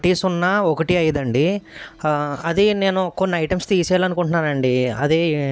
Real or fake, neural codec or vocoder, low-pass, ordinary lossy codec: real; none; none; none